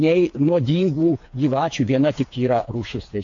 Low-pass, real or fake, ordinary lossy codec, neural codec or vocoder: 7.2 kHz; fake; AAC, 48 kbps; codec, 16 kHz, 4 kbps, FreqCodec, smaller model